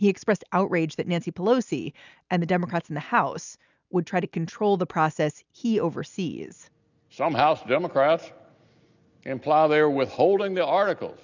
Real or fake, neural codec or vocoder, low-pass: real; none; 7.2 kHz